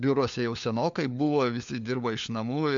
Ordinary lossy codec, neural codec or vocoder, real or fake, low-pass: AAC, 64 kbps; codec, 16 kHz, 4 kbps, FunCodec, trained on LibriTTS, 50 frames a second; fake; 7.2 kHz